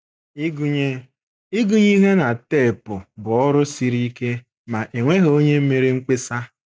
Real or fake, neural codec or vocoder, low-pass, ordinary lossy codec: real; none; none; none